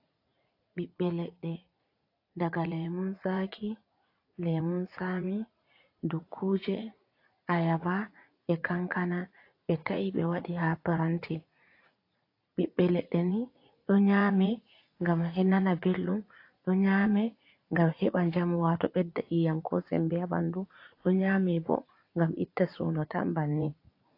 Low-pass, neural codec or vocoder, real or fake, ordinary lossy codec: 5.4 kHz; vocoder, 44.1 kHz, 80 mel bands, Vocos; fake; AAC, 32 kbps